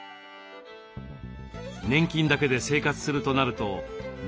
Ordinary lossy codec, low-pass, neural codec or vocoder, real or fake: none; none; none; real